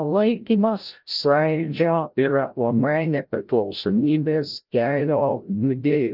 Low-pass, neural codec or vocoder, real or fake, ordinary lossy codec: 5.4 kHz; codec, 16 kHz, 0.5 kbps, FreqCodec, larger model; fake; Opus, 24 kbps